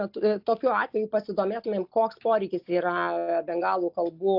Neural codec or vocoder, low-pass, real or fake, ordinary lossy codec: none; 5.4 kHz; real; AAC, 48 kbps